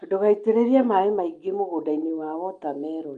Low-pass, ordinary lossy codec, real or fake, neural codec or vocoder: 9.9 kHz; Opus, 32 kbps; real; none